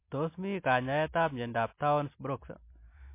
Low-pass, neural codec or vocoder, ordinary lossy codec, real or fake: 3.6 kHz; none; MP3, 24 kbps; real